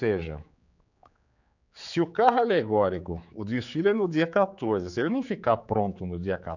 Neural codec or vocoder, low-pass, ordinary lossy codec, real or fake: codec, 16 kHz, 4 kbps, X-Codec, HuBERT features, trained on general audio; 7.2 kHz; none; fake